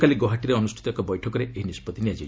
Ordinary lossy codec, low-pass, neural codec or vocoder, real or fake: none; none; none; real